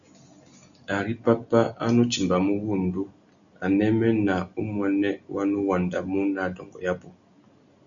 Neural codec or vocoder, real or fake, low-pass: none; real; 7.2 kHz